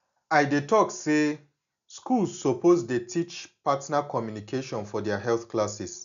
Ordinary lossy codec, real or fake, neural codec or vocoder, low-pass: MP3, 96 kbps; real; none; 7.2 kHz